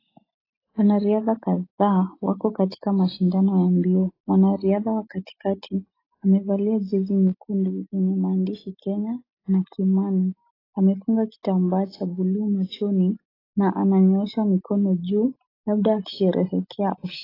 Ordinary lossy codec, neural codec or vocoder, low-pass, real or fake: AAC, 24 kbps; none; 5.4 kHz; real